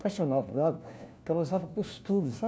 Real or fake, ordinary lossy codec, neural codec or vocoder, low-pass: fake; none; codec, 16 kHz, 1 kbps, FunCodec, trained on LibriTTS, 50 frames a second; none